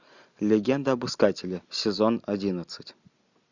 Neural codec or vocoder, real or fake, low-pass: none; real; 7.2 kHz